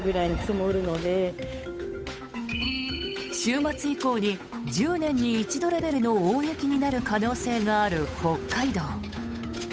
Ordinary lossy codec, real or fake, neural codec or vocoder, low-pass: none; fake; codec, 16 kHz, 8 kbps, FunCodec, trained on Chinese and English, 25 frames a second; none